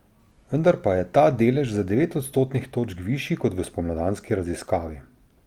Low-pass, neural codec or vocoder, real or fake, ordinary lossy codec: 19.8 kHz; none; real; Opus, 32 kbps